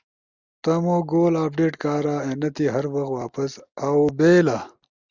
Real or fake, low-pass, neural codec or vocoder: real; 7.2 kHz; none